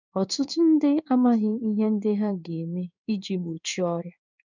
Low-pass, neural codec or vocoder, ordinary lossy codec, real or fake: 7.2 kHz; codec, 16 kHz in and 24 kHz out, 1 kbps, XY-Tokenizer; none; fake